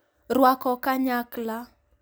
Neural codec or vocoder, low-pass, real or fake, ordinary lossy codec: none; none; real; none